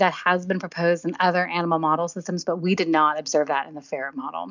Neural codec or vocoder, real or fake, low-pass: none; real; 7.2 kHz